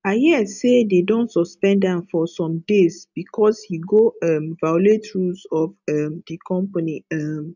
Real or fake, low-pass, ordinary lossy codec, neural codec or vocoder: real; 7.2 kHz; none; none